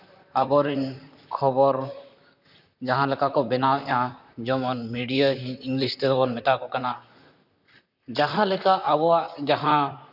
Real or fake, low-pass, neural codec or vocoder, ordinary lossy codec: fake; 5.4 kHz; vocoder, 44.1 kHz, 128 mel bands, Pupu-Vocoder; none